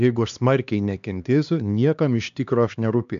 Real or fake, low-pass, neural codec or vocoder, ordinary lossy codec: fake; 7.2 kHz; codec, 16 kHz, 2 kbps, X-Codec, HuBERT features, trained on LibriSpeech; MP3, 64 kbps